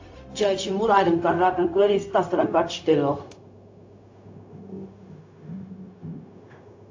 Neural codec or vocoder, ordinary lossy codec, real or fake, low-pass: codec, 16 kHz, 0.4 kbps, LongCat-Audio-Codec; AAC, 48 kbps; fake; 7.2 kHz